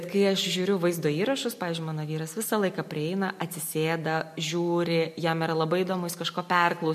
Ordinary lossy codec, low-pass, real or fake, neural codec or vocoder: MP3, 64 kbps; 14.4 kHz; real; none